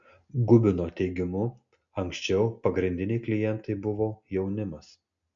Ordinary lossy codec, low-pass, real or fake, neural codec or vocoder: MP3, 64 kbps; 7.2 kHz; real; none